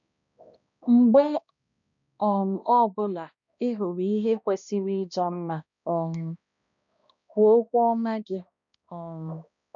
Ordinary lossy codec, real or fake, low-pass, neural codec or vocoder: none; fake; 7.2 kHz; codec, 16 kHz, 1 kbps, X-Codec, HuBERT features, trained on balanced general audio